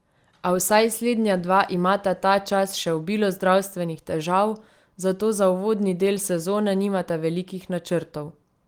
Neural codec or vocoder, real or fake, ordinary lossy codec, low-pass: none; real; Opus, 24 kbps; 19.8 kHz